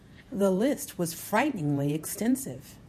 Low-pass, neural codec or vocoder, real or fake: 14.4 kHz; vocoder, 48 kHz, 128 mel bands, Vocos; fake